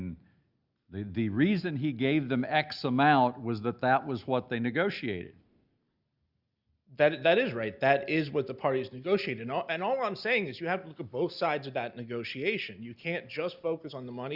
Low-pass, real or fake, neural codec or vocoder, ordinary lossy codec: 5.4 kHz; real; none; Opus, 64 kbps